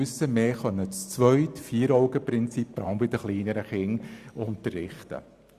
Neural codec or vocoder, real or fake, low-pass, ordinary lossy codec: none; real; 14.4 kHz; Opus, 64 kbps